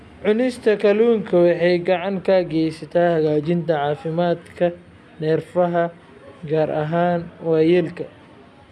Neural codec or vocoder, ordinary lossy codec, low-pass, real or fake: none; none; none; real